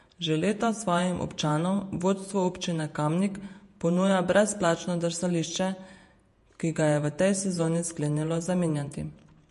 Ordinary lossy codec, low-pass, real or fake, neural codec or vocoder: MP3, 48 kbps; 14.4 kHz; fake; vocoder, 48 kHz, 128 mel bands, Vocos